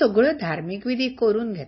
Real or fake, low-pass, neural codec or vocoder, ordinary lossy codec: real; 7.2 kHz; none; MP3, 24 kbps